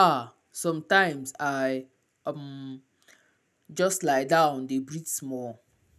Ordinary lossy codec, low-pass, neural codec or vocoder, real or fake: none; 14.4 kHz; none; real